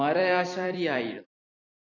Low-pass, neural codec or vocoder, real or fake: 7.2 kHz; vocoder, 44.1 kHz, 128 mel bands every 256 samples, BigVGAN v2; fake